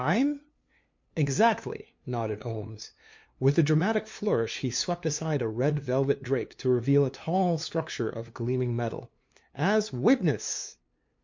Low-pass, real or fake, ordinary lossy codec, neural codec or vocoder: 7.2 kHz; fake; MP3, 48 kbps; codec, 16 kHz, 2 kbps, FunCodec, trained on LibriTTS, 25 frames a second